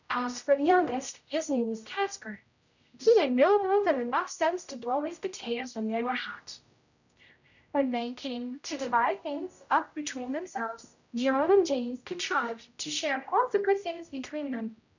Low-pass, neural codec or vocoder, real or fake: 7.2 kHz; codec, 16 kHz, 0.5 kbps, X-Codec, HuBERT features, trained on general audio; fake